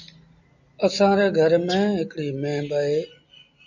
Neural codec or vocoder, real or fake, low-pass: none; real; 7.2 kHz